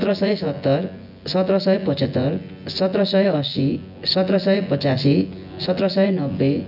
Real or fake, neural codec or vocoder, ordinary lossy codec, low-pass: fake; vocoder, 24 kHz, 100 mel bands, Vocos; none; 5.4 kHz